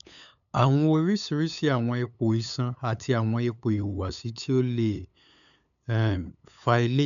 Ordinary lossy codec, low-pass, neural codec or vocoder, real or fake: none; 7.2 kHz; codec, 16 kHz, 8 kbps, FunCodec, trained on LibriTTS, 25 frames a second; fake